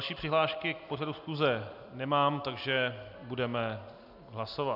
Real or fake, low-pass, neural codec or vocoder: real; 5.4 kHz; none